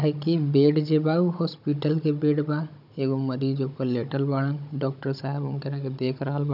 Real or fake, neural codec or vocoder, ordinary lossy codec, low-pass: fake; codec, 16 kHz, 16 kbps, FunCodec, trained on Chinese and English, 50 frames a second; none; 5.4 kHz